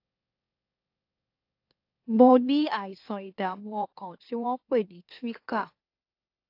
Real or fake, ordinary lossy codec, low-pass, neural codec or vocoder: fake; AAC, 32 kbps; 5.4 kHz; autoencoder, 44.1 kHz, a latent of 192 numbers a frame, MeloTTS